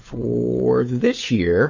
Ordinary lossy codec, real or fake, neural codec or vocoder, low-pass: MP3, 32 kbps; fake; codec, 16 kHz, 8 kbps, FunCodec, trained on Chinese and English, 25 frames a second; 7.2 kHz